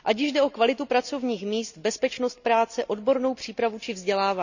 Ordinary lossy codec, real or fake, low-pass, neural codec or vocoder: none; real; 7.2 kHz; none